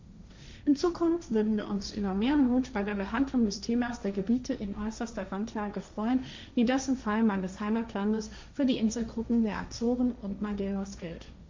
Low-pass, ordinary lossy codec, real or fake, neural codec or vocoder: 7.2 kHz; MP3, 64 kbps; fake; codec, 16 kHz, 1.1 kbps, Voila-Tokenizer